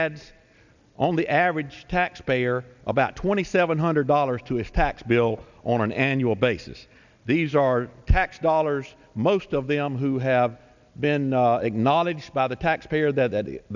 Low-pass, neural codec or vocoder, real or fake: 7.2 kHz; none; real